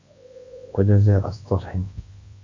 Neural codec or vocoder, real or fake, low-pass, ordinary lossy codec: codec, 24 kHz, 0.9 kbps, WavTokenizer, large speech release; fake; 7.2 kHz; AAC, 32 kbps